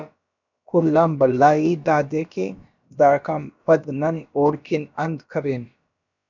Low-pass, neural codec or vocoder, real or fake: 7.2 kHz; codec, 16 kHz, about 1 kbps, DyCAST, with the encoder's durations; fake